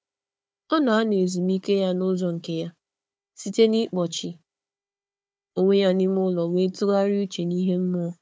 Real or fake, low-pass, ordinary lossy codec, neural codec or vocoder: fake; none; none; codec, 16 kHz, 4 kbps, FunCodec, trained on Chinese and English, 50 frames a second